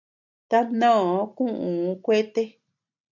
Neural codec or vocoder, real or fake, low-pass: none; real; 7.2 kHz